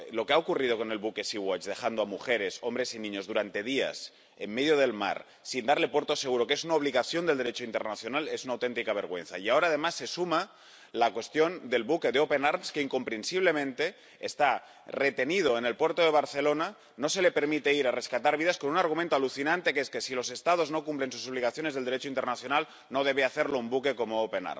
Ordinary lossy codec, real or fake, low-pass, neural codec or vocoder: none; real; none; none